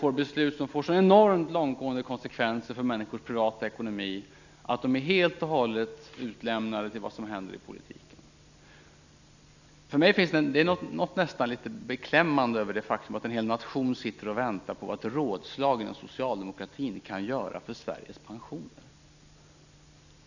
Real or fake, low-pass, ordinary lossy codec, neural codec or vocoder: real; 7.2 kHz; none; none